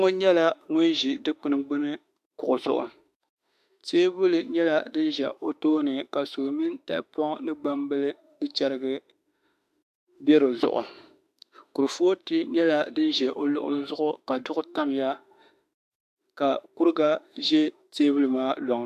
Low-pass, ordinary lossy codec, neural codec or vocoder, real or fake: 14.4 kHz; AAC, 96 kbps; autoencoder, 48 kHz, 32 numbers a frame, DAC-VAE, trained on Japanese speech; fake